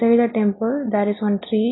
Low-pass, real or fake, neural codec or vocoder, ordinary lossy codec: 7.2 kHz; real; none; AAC, 16 kbps